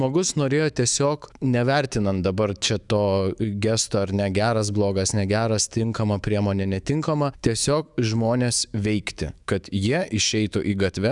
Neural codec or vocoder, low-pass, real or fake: autoencoder, 48 kHz, 128 numbers a frame, DAC-VAE, trained on Japanese speech; 10.8 kHz; fake